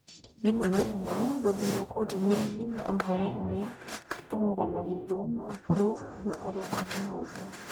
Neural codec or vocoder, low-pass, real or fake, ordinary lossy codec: codec, 44.1 kHz, 0.9 kbps, DAC; none; fake; none